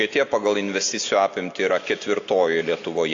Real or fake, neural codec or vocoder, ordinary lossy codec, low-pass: real; none; AAC, 48 kbps; 7.2 kHz